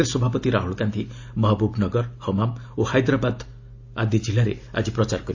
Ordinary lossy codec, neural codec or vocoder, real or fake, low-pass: AAC, 48 kbps; none; real; 7.2 kHz